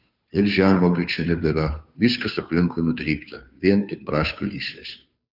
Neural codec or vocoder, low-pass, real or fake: codec, 16 kHz, 2 kbps, FunCodec, trained on Chinese and English, 25 frames a second; 5.4 kHz; fake